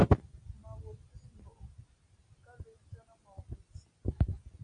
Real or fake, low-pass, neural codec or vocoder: real; 9.9 kHz; none